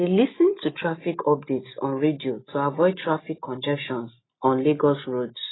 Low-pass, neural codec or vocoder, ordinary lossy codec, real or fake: 7.2 kHz; none; AAC, 16 kbps; real